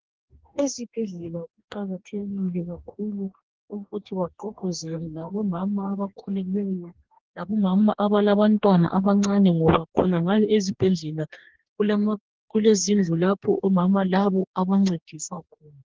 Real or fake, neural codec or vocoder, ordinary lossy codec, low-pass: fake; codec, 44.1 kHz, 2.6 kbps, DAC; Opus, 16 kbps; 7.2 kHz